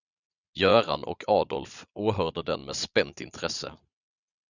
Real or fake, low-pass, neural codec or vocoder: fake; 7.2 kHz; vocoder, 22.05 kHz, 80 mel bands, Vocos